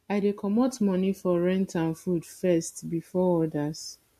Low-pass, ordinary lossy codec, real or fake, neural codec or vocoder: 14.4 kHz; MP3, 64 kbps; real; none